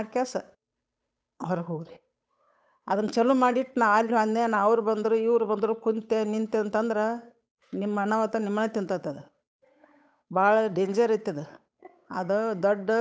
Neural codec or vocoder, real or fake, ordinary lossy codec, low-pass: codec, 16 kHz, 8 kbps, FunCodec, trained on Chinese and English, 25 frames a second; fake; none; none